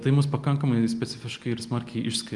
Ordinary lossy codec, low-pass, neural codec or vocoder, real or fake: Opus, 32 kbps; 10.8 kHz; none; real